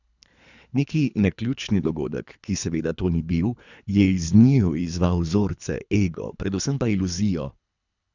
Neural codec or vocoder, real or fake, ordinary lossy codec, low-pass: codec, 24 kHz, 3 kbps, HILCodec; fake; none; 7.2 kHz